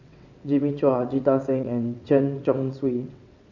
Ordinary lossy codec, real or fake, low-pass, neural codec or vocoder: none; fake; 7.2 kHz; vocoder, 22.05 kHz, 80 mel bands, WaveNeXt